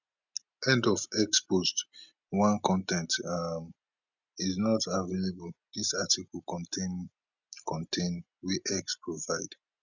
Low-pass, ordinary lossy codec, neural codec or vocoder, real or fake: 7.2 kHz; none; none; real